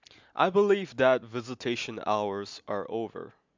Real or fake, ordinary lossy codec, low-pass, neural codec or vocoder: real; MP3, 64 kbps; 7.2 kHz; none